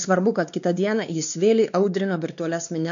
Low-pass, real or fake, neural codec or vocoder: 7.2 kHz; fake; codec, 16 kHz, 2 kbps, X-Codec, WavLM features, trained on Multilingual LibriSpeech